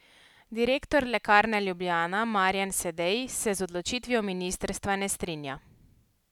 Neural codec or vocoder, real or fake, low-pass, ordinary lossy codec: none; real; 19.8 kHz; none